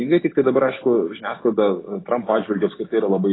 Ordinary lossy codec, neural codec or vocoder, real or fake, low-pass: AAC, 16 kbps; none; real; 7.2 kHz